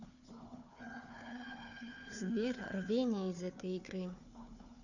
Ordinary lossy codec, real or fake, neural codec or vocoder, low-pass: none; fake; codec, 16 kHz, 4 kbps, FunCodec, trained on Chinese and English, 50 frames a second; 7.2 kHz